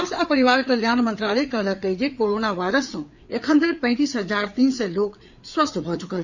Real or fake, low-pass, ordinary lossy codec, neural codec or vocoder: fake; 7.2 kHz; none; codec, 16 kHz, 4 kbps, FreqCodec, larger model